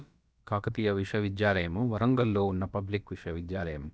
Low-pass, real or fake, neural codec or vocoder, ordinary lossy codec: none; fake; codec, 16 kHz, about 1 kbps, DyCAST, with the encoder's durations; none